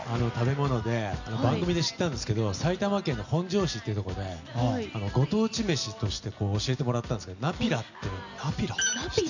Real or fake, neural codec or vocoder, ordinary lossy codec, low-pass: real; none; none; 7.2 kHz